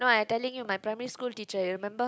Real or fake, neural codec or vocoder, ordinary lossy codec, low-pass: real; none; none; none